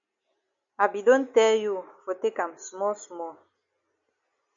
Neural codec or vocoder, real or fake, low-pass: none; real; 7.2 kHz